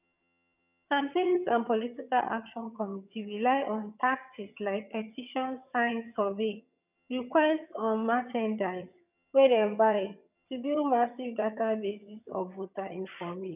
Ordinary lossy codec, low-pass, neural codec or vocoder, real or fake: none; 3.6 kHz; vocoder, 22.05 kHz, 80 mel bands, HiFi-GAN; fake